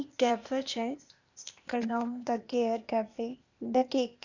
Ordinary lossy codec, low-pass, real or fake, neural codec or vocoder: none; 7.2 kHz; fake; codec, 16 kHz, 0.8 kbps, ZipCodec